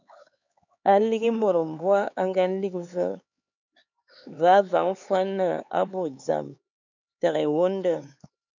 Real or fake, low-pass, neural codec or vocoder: fake; 7.2 kHz; codec, 16 kHz, 4 kbps, X-Codec, HuBERT features, trained on LibriSpeech